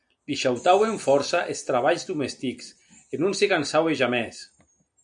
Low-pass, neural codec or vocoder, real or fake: 9.9 kHz; none; real